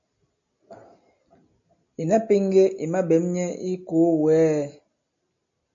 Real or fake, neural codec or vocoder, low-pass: real; none; 7.2 kHz